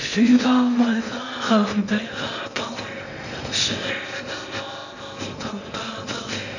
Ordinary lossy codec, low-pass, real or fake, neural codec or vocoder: none; 7.2 kHz; fake; codec, 16 kHz in and 24 kHz out, 0.6 kbps, FocalCodec, streaming, 2048 codes